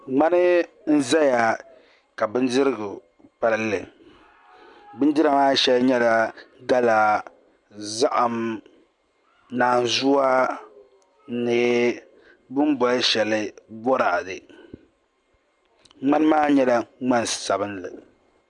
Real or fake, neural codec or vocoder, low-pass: real; none; 10.8 kHz